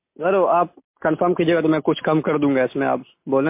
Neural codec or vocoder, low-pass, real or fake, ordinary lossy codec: none; 3.6 kHz; real; MP3, 24 kbps